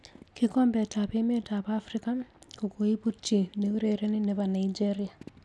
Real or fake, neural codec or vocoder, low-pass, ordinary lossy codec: real; none; none; none